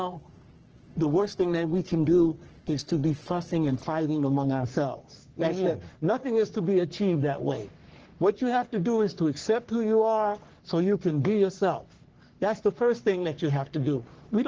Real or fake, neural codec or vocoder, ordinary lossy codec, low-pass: fake; codec, 44.1 kHz, 3.4 kbps, Pupu-Codec; Opus, 16 kbps; 7.2 kHz